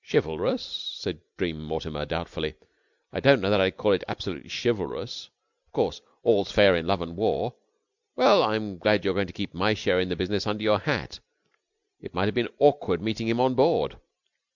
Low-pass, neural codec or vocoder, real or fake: 7.2 kHz; none; real